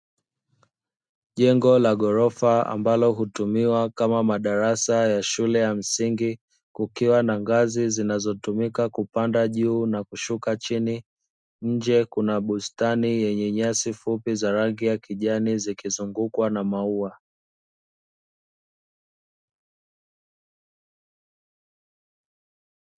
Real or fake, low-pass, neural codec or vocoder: real; 9.9 kHz; none